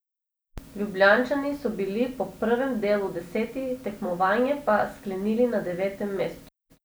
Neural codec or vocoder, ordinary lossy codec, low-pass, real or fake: none; none; none; real